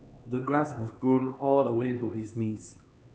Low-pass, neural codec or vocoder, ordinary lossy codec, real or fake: none; codec, 16 kHz, 2 kbps, X-Codec, HuBERT features, trained on LibriSpeech; none; fake